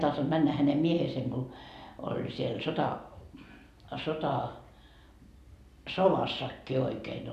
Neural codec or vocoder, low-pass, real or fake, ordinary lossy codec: none; 14.4 kHz; real; none